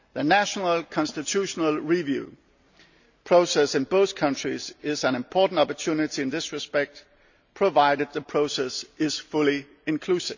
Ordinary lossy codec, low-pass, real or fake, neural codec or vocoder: none; 7.2 kHz; real; none